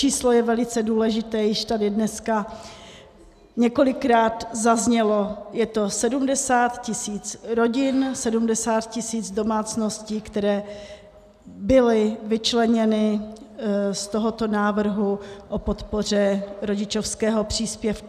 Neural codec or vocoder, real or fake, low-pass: none; real; 14.4 kHz